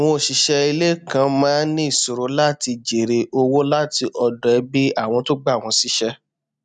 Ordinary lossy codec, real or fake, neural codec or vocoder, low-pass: none; real; none; 9.9 kHz